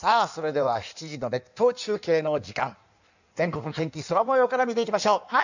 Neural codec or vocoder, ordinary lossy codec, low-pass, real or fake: codec, 16 kHz in and 24 kHz out, 1.1 kbps, FireRedTTS-2 codec; none; 7.2 kHz; fake